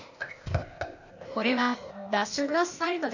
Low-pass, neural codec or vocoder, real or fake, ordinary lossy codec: 7.2 kHz; codec, 16 kHz, 0.8 kbps, ZipCodec; fake; none